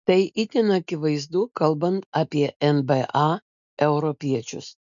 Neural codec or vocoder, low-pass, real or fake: none; 7.2 kHz; real